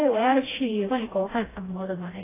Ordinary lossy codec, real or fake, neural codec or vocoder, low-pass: AAC, 16 kbps; fake; codec, 16 kHz, 1 kbps, FreqCodec, smaller model; 3.6 kHz